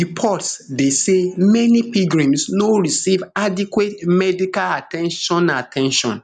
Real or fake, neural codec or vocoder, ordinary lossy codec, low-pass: real; none; none; 9.9 kHz